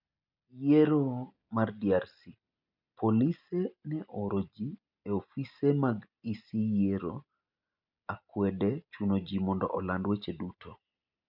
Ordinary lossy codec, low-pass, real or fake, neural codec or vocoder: none; 5.4 kHz; real; none